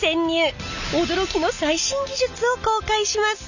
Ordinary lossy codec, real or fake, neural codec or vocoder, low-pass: none; real; none; 7.2 kHz